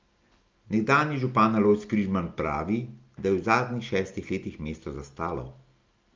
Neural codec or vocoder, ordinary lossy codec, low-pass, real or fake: none; Opus, 24 kbps; 7.2 kHz; real